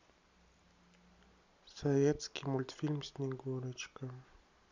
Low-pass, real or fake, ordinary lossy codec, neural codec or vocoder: 7.2 kHz; real; Opus, 64 kbps; none